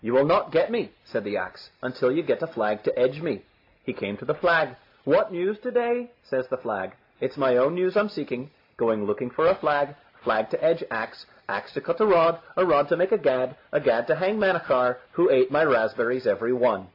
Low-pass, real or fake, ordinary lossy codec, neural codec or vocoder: 5.4 kHz; real; AAC, 32 kbps; none